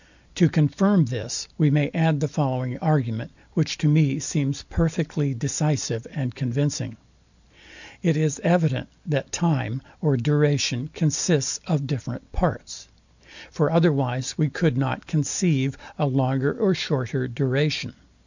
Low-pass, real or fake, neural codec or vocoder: 7.2 kHz; real; none